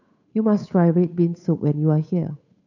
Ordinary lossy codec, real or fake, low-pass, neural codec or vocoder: none; fake; 7.2 kHz; codec, 16 kHz, 8 kbps, FunCodec, trained on Chinese and English, 25 frames a second